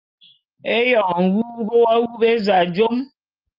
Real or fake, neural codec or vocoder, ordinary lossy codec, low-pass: real; none; Opus, 32 kbps; 5.4 kHz